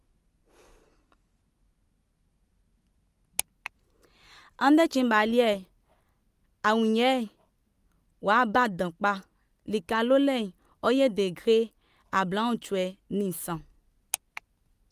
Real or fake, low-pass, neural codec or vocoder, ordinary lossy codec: real; 14.4 kHz; none; Opus, 32 kbps